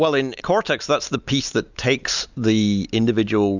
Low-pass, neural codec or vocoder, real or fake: 7.2 kHz; none; real